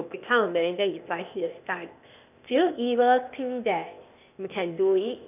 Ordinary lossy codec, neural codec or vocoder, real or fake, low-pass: none; codec, 16 kHz, 0.8 kbps, ZipCodec; fake; 3.6 kHz